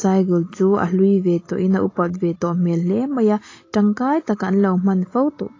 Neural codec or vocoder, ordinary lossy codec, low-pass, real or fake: none; AAC, 32 kbps; 7.2 kHz; real